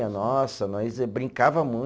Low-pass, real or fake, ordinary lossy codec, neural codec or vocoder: none; real; none; none